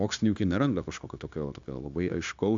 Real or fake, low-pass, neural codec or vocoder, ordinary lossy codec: fake; 7.2 kHz; codec, 16 kHz, 0.9 kbps, LongCat-Audio-Codec; AAC, 64 kbps